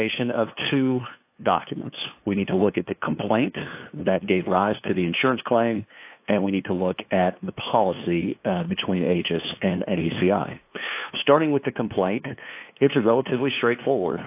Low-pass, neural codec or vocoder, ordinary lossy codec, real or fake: 3.6 kHz; codec, 16 kHz, 2 kbps, FunCodec, trained on LibriTTS, 25 frames a second; AAC, 32 kbps; fake